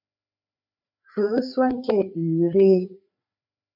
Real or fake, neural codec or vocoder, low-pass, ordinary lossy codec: fake; codec, 16 kHz, 4 kbps, FreqCodec, larger model; 5.4 kHz; MP3, 48 kbps